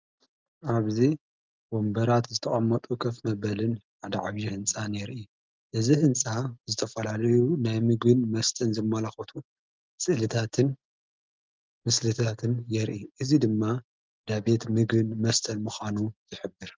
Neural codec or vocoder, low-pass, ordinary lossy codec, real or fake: none; 7.2 kHz; Opus, 24 kbps; real